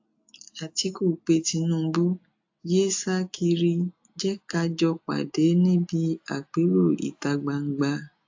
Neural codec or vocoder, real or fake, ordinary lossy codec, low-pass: none; real; AAC, 48 kbps; 7.2 kHz